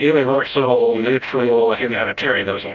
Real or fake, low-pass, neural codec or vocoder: fake; 7.2 kHz; codec, 16 kHz, 0.5 kbps, FreqCodec, smaller model